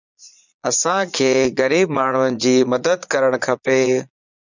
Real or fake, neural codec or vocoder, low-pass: fake; vocoder, 22.05 kHz, 80 mel bands, Vocos; 7.2 kHz